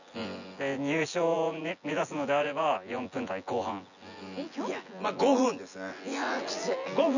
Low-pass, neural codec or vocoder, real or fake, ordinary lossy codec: 7.2 kHz; vocoder, 24 kHz, 100 mel bands, Vocos; fake; none